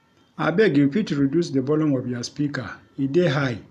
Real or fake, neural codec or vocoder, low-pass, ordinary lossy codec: real; none; 14.4 kHz; none